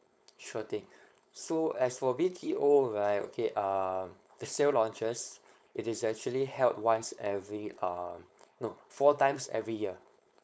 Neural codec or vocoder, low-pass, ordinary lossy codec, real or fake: codec, 16 kHz, 4.8 kbps, FACodec; none; none; fake